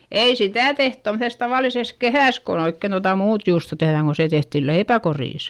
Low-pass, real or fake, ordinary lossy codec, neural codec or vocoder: 19.8 kHz; real; Opus, 24 kbps; none